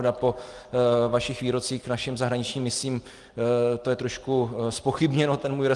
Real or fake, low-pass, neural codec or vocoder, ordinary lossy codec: real; 10.8 kHz; none; Opus, 24 kbps